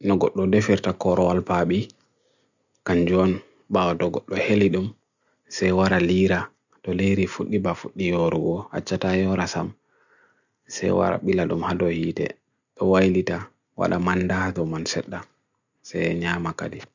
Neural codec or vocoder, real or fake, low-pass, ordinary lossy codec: none; real; 7.2 kHz; none